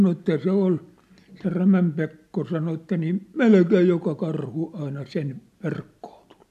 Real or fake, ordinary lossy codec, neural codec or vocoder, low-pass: real; AAC, 96 kbps; none; 14.4 kHz